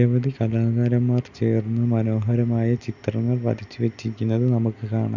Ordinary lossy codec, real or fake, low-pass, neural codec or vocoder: none; real; 7.2 kHz; none